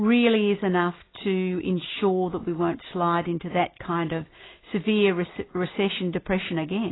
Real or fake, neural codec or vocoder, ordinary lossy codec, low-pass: real; none; AAC, 16 kbps; 7.2 kHz